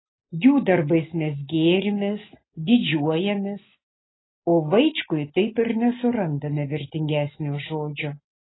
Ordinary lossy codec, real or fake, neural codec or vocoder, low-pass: AAC, 16 kbps; real; none; 7.2 kHz